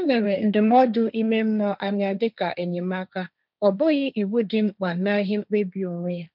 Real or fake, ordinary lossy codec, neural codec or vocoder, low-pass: fake; none; codec, 16 kHz, 1.1 kbps, Voila-Tokenizer; 5.4 kHz